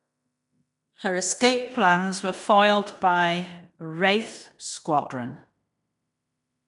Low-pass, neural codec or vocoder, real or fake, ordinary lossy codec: 10.8 kHz; codec, 16 kHz in and 24 kHz out, 0.9 kbps, LongCat-Audio-Codec, fine tuned four codebook decoder; fake; none